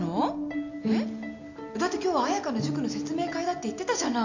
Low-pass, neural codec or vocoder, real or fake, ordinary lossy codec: 7.2 kHz; none; real; none